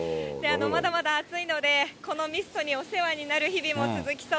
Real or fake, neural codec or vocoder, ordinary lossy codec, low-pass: real; none; none; none